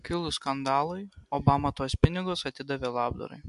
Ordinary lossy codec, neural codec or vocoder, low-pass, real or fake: MP3, 64 kbps; none; 10.8 kHz; real